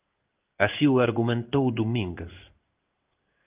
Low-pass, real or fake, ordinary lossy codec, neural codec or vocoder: 3.6 kHz; fake; Opus, 32 kbps; codec, 16 kHz in and 24 kHz out, 1 kbps, XY-Tokenizer